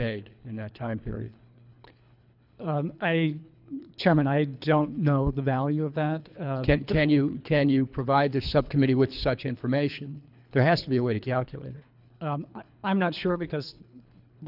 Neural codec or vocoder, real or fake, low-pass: codec, 24 kHz, 3 kbps, HILCodec; fake; 5.4 kHz